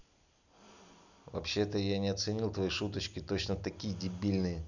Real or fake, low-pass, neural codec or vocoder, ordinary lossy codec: real; 7.2 kHz; none; none